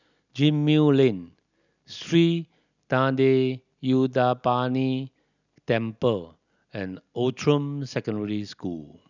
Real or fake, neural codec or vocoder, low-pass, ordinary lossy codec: real; none; 7.2 kHz; none